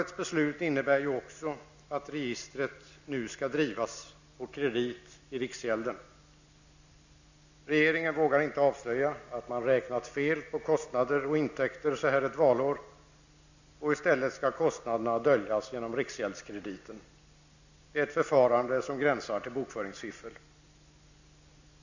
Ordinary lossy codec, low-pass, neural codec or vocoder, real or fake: none; 7.2 kHz; none; real